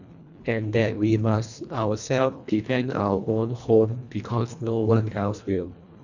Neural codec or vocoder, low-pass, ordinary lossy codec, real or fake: codec, 24 kHz, 1.5 kbps, HILCodec; 7.2 kHz; none; fake